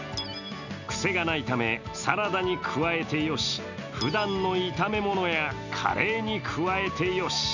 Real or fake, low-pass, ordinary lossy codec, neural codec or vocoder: real; 7.2 kHz; none; none